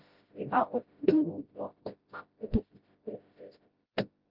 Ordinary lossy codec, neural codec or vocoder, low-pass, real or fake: Opus, 24 kbps; codec, 16 kHz, 0.5 kbps, FreqCodec, smaller model; 5.4 kHz; fake